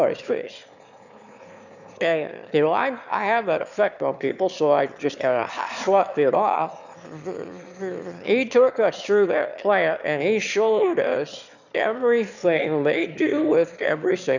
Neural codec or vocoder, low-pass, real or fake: autoencoder, 22.05 kHz, a latent of 192 numbers a frame, VITS, trained on one speaker; 7.2 kHz; fake